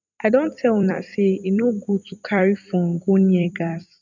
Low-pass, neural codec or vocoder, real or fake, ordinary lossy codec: 7.2 kHz; vocoder, 44.1 kHz, 128 mel bands every 256 samples, BigVGAN v2; fake; none